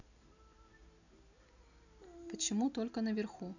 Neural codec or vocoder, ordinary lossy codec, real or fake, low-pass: none; none; real; 7.2 kHz